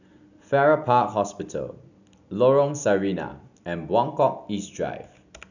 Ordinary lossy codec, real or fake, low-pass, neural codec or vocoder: none; real; 7.2 kHz; none